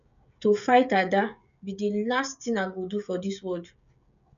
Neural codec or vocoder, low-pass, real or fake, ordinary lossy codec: codec, 16 kHz, 16 kbps, FreqCodec, smaller model; 7.2 kHz; fake; none